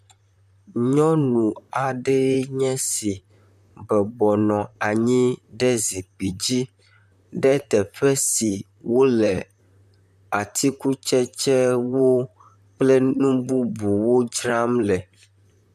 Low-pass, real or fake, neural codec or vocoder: 14.4 kHz; fake; vocoder, 44.1 kHz, 128 mel bands, Pupu-Vocoder